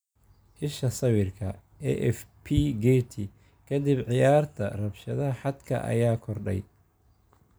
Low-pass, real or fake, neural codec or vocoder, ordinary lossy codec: none; real; none; none